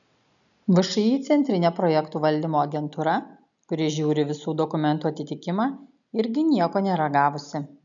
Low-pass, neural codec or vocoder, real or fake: 7.2 kHz; none; real